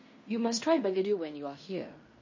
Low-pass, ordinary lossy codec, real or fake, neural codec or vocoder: 7.2 kHz; MP3, 32 kbps; fake; codec, 16 kHz in and 24 kHz out, 0.9 kbps, LongCat-Audio-Codec, fine tuned four codebook decoder